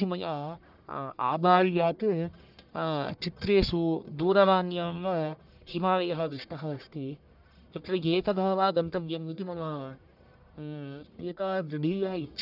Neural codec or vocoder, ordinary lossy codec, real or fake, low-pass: codec, 44.1 kHz, 1.7 kbps, Pupu-Codec; none; fake; 5.4 kHz